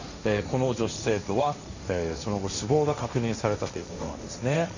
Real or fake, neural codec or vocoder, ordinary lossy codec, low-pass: fake; codec, 16 kHz, 1.1 kbps, Voila-Tokenizer; none; 7.2 kHz